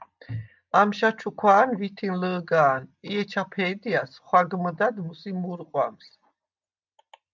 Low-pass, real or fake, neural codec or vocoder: 7.2 kHz; real; none